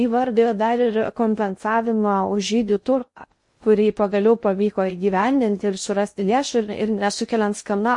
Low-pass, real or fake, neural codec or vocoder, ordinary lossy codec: 10.8 kHz; fake; codec, 16 kHz in and 24 kHz out, 0.6 kbps, FocalCodec, streaming, 2048 codes; MP3, 48 kbps